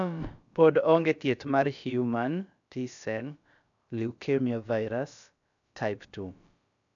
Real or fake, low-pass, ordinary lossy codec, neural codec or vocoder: fake; 7.2 kHz; none; codec, 16 kHz, about 1 kbps, DyCAST, with the encoder's durations